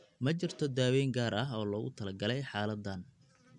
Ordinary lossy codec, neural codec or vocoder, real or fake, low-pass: none; none; real; 10.8 kHz